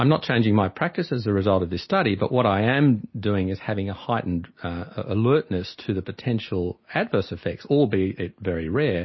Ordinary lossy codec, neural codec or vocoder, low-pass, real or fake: MP3, 24 kbps; none; 7.2 kHz; real